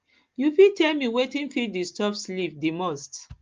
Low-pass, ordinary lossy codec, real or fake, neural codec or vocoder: 7.2 kHz; Opus, 32 kbps; real; none